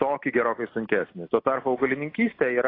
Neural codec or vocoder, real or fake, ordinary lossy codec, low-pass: none; real; AAC, 24 kbps; 5.4 kHz